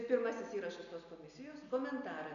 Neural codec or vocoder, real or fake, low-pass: none; real; 7.2 kHz